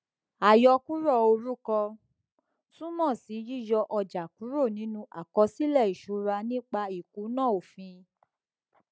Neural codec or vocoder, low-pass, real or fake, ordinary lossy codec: none; none; real; none